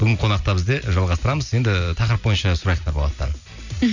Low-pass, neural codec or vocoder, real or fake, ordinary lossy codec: 7.2 kHz; none; real; none